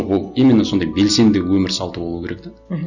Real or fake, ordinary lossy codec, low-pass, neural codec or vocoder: real; none; 7.2 kHz; none